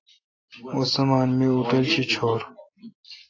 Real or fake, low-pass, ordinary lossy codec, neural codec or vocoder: real; 7.2 kHz; AAC, 32 kbps; none